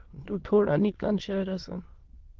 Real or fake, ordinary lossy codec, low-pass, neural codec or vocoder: fake; Opus, 16 kbps; 7.2 kHz; autoencoder, 22.05 kHz, a latent of 192 numbers a frame, VITS, trained on many speakers